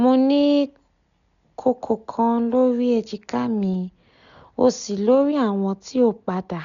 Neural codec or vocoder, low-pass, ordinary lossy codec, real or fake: none; 7.2 kHz; none; real